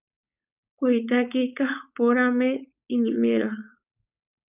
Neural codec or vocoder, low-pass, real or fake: codec, 16 kHz, 4.8 kbps, FACodec; 3.6 kHz; fake